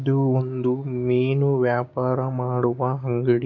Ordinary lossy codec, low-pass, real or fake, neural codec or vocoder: MP3, 64 kbps; 7.2 kHz; fake; codec, 16 kHz, 6 kbps, DAC